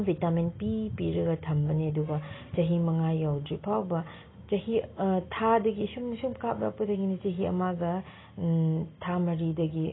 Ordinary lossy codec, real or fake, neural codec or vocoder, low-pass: AAC, 16 kbps; real; none; 7.2 kHz